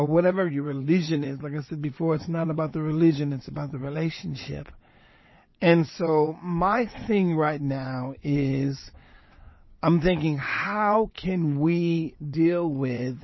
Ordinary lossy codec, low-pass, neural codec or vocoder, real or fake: MP3, 24 kbps; 7.2 kHz; vocoder, 22.05 kHz, 80 mel bands, WaveNeXt; fake